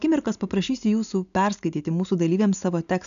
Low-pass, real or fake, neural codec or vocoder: 7.2 kHz; real; none